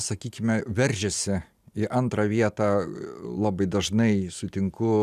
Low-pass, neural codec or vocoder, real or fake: 14.4 kHz; none; real